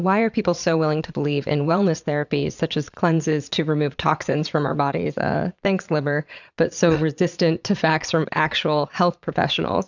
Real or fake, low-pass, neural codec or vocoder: fake; 7.2 kHz; vocoder, 22.05 kHz, 80 mel bands, Vocos